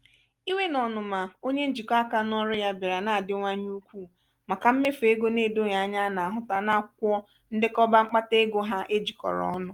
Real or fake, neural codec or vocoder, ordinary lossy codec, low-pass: real; none; Opus, 32 kbps; 19.8 kHz